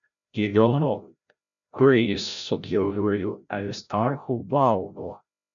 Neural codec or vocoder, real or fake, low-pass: codec, 16 kHz, 0.5 kbps, FreqCodec, larger model; fake; 7.2 kHz